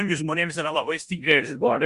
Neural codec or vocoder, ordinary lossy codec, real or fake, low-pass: codec, 16 kHz in and 24 kHz out, 0.9 kbps, LongCat-Audio-Codec, four codebook decoder; Opus, 64 kbps; fake; 10.8 kHz